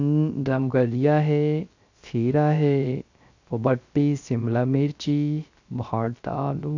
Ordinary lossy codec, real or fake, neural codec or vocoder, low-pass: AAC, 48 kbps; fake; codec, 16 kHz, 0.3 kbps, FocalCodec; 7.2 kHz